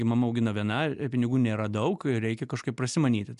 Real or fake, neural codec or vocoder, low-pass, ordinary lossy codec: real; none; 10.8 kHz; MP3, 96 kbps